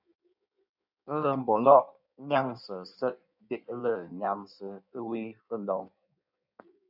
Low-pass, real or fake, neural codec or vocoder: 5.4 kHz; fake; codec, 16 kHz in and 24 kHz out, 1.1 kbps, FireRedTTS-2 codec